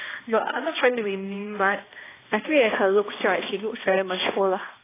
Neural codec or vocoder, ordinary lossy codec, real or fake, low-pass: codec, 16 kHz, 1 kbps, X-Codec, HuBERT features, trained on balanced general audio; AAC, 16 kbps; fake; 3.6 kHz